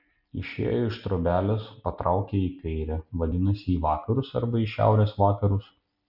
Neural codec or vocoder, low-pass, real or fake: none; 5.4 kHz; real